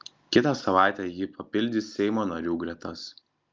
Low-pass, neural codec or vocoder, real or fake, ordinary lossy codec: 7.2 kHz; none; real; Opus, 24 kbps